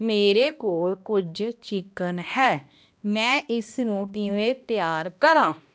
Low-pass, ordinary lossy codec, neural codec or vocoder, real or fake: none; none; codec, 16 kHz, 1 kbps, X-Codec, HuBERT features, trained on balanced general audio; fake